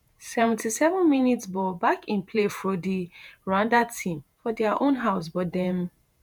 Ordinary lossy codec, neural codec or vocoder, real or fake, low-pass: none; vocoder, 48 kHz, 128 mel bands, Vocos; fake; none